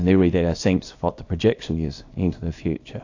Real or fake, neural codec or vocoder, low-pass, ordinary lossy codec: fake; codec, 24 kHz, 0.9 kbps, WavTokenizer, small release; 7.2 kHz; AAC, 48 kbps